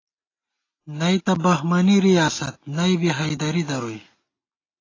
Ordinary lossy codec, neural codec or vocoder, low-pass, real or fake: AAC, 32 kbps; none; 7.2 kHz; real